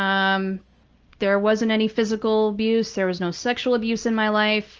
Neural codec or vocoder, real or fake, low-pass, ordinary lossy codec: none; real; 7.2 kHz; Opus, 24 kbps